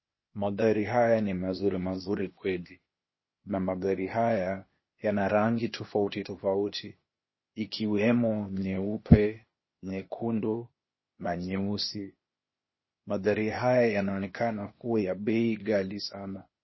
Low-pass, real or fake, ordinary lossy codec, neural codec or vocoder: 7.2 kHz; fake; MP3, 24 kbps; codec, 16 kHz, 0.8 kbps, ZipCodec